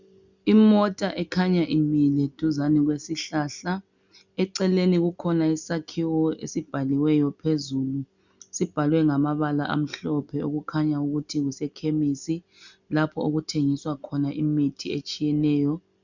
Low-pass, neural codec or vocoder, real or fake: 7.2 kHz; none; real